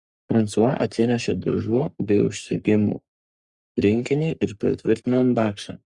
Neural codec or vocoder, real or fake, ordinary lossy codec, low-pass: codec, 44.1 kHz, 3.4 kbps, Pupu-Codec; fake; Opus, 64 kbps; 10.8 kHz